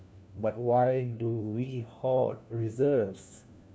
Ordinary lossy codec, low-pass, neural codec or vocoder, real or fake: none; none; codec, 16 kHz, 1 kbps, FunCodec, trained on LibriTTS, 50 frames a second; fake